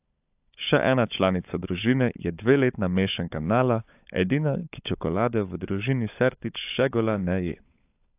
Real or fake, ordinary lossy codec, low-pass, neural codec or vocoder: fake; AAC, 32 kbps; 3.6 kHz; codec, 16 kHz, 16 kbps, FunCodec, trained on LibriTTS, 50 frames a second